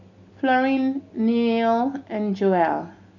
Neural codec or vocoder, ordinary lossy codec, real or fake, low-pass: none; none; real; 7.2 kHz